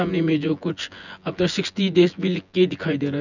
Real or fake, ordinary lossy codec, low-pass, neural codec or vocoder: fake; none; 7.2 kHz; vocoder, 24 kHz, 100 mel bands, Vocos